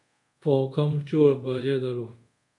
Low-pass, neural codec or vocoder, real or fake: 10.8 kHz; codec, 24 kHz, 0.5 kbps, DualCodec; fake